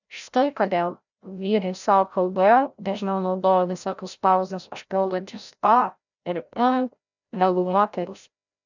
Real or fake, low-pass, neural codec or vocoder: fake; 7.2 kHz; codec, 16 kHz, 0.5 kbps, FreqCodec, larger model